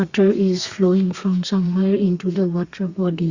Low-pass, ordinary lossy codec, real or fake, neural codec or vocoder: 7.2 kHz; Opus, 64 kbps; fake; codec, 32 kHz, 1.9 kbps, SNAC